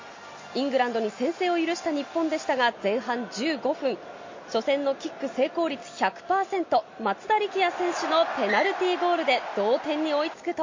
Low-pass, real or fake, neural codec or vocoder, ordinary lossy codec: 7.2 kHz; real; none; MP3, 32 kbps